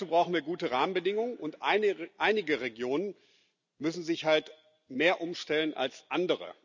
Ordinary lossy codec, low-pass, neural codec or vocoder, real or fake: none; 7.2 kHz; none; real